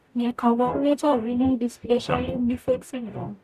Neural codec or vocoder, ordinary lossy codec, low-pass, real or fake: codec, 44.1 kHz, 0.9 kbps, DAC; none; 14.4 kHz; fake